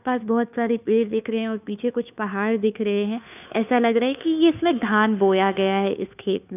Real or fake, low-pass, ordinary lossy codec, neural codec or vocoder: fake; 3.6 kHz; none; codec, 16 kHz, 2 kbps, FunCodec, trained on Chinese and English, 25 frames a second